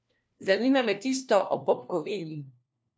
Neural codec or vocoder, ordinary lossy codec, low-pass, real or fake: codec, 16 kHz, 1 kbps, FunCodec, trained on LibriTTS, 50 frames a second; none; none; fake